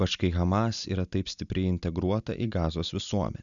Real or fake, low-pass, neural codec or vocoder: real; 7.2 kHz; none